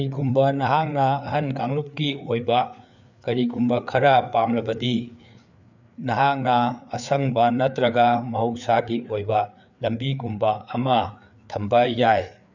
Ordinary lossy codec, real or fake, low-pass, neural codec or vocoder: none; fake; 7.2 kHz; codec, 16 kHz, 4 kbps, FreqCodec, larger model